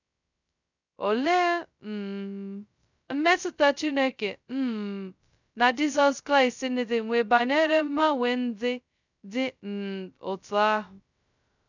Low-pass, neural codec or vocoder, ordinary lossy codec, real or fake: 7.2 kHz; codec, 16 kHz, 0.2 kbps, FocalCodec; none; fake